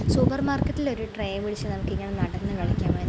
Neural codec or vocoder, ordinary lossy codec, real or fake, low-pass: none; none; real; none